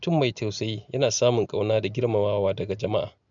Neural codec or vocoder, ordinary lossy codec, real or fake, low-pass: none; none; real; 7.2 kHz